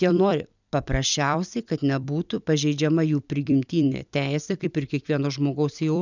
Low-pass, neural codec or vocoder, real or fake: 7.2 kHz; vocoder, 44.1 kHz, 128 mel bands every 256 samples, BigVGAN v2; fake